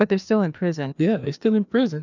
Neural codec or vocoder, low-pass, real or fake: codec, 16 kHz, 2 kbps, FreqCodec, larger model; 7.2 kHz; fake